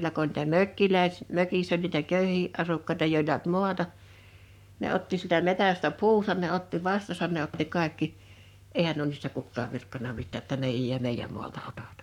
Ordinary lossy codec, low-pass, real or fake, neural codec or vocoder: none; 19.8 kHz; fake; codec, 44.1 kHz, 7.8 kbps, Pupu-Codec